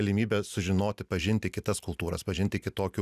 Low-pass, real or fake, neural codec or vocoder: 14.4 kHz; real; none